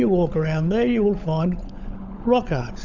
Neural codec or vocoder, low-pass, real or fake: codec, 16 kHz, 16 kbps, FunCodec, trained on LibriTTS, 50 frames a second; 7.2 kHz; fake